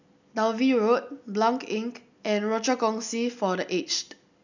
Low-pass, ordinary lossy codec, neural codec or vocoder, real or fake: 7.2 kHz; none; none; real